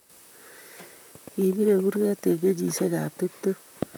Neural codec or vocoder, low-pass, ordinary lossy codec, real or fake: vocoder, 44.1 kHz, 128 mel bands, Pupu-Vocoder; none; none; fake